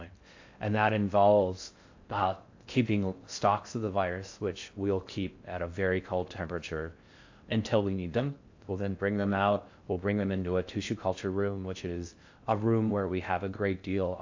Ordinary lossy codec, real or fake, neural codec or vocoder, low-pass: AAC, 48 kbps; fake; codec, 16 kHz in and 24 kHz out, 0.6 kbps, FocalCodec, streaming, 4096 codes; 7.2 kHz